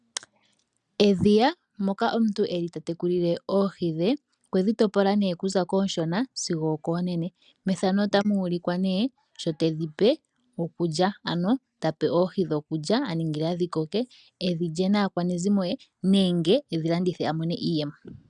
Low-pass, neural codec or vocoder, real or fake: 10.8 kHz; none; real